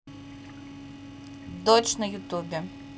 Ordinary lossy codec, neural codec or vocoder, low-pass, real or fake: none; none; none; real